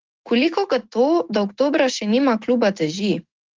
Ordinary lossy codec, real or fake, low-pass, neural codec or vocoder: Opus, 16 kbps; fake; 7.2 kHz; autoencoder, 48 kHz, 128 numbers a frame, DAC-VAE, trained on Japanese speech